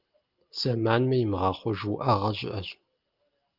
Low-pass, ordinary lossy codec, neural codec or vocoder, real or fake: 5.4 kHz; Opus, 24 kbps; none; real